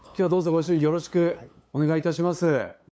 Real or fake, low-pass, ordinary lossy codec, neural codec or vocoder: fake; none; none; codec, 16 kHz, 8 kbps, FunCodec, trained on LibriTTS, 25 frames a second